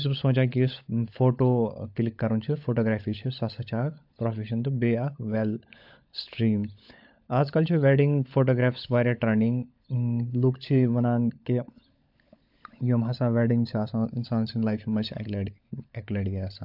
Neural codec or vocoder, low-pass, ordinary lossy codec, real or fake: codec, 16 kHz, 16 kbps, FunCodec, trained on LibriTTS, 50 frames a second; 5.4 kHz; AAC, 48 kbps; fake